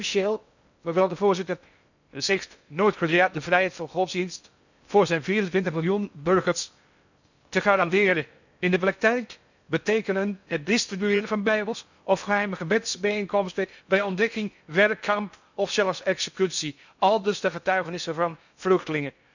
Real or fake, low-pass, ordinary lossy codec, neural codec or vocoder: fake; 7.2 kHz; none; codec, 16 kHz in and 24 kHz out, 0.6 kbps, FocalCodec, streaming, 2048 codes